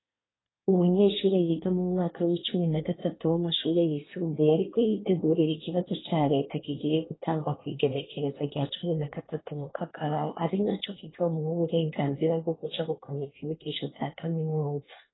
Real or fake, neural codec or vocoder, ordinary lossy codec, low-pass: fake; codec, 24 kHz, 1 kbps, SNAC; AAC, 16 kbps; 7.2 kHz